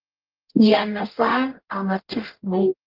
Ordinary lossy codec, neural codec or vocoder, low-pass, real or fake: Opus, 24 kbps; codec, 44.1 kHz, 0.9 kbps, DAC; 5.4 kHz; fake